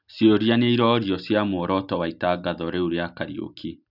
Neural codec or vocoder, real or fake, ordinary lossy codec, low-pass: none; real; none; 5.4 kHz